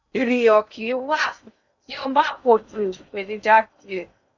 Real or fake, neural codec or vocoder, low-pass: fake; codec, 16 kHz in and 24 kHz out, 0.6 kbps, FocalCodec, streaming, 2048 codes; 7.2 kHz